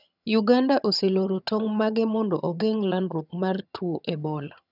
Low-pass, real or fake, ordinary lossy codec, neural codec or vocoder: 5.4 kHz; fake; none; vocoder, 22.05 kHz, 80 mel bands, HiFi-GAN